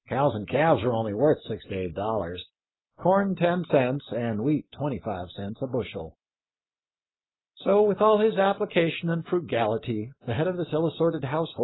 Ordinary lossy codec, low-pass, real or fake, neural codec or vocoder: AAC, 16 kbps; 7.2 kHz; real; none